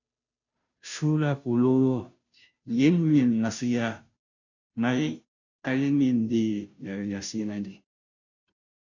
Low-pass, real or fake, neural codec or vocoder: 7.2 kHz; fake; codec, 16 kHz, 0.5 kbps, FunCodec, trained on Chinese and English, 25 frames a second